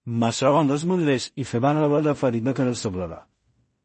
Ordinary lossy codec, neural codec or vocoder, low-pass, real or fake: MP3, 32 kbps; codec, 16 kHz in and 24 kHz out, 0.4 kbps, LongCat-Audio-Codec, two codebook decoder; 10.8 kHz; fake